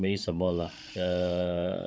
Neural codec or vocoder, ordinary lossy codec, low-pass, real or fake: codec, 16 kHz, 16 kbps, FreqCodec, larger model; none; none; fake